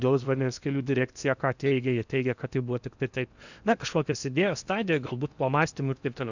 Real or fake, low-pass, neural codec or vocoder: fake; 7.2 kHz; codec, 16 kHz in and 24 kHz out, 0.8 kbps, FocalCodec, streaming, 65536 codes